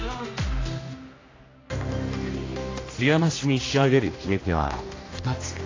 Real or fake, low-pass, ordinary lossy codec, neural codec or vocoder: fake; 7.2 kHz; AAC, 32 kbps; codec, 16 kHz, 1 kbps, X-Codec, HuBERT features, trained on general audio